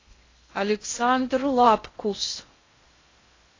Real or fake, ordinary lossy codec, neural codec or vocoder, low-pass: fake; AAC, 32 kbps; codec, 16 kHz in and 24 kHz out, 0.6 kbps, FocalCodec, streaming, 2048 codes; 7.2 kHz